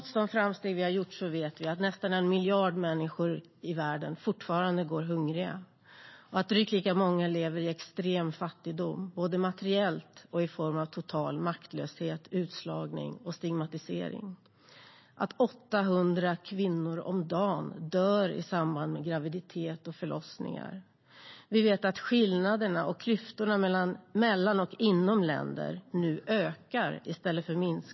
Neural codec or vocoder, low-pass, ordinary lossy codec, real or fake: none; 7.2 kHz; MP3, 24 kbps; real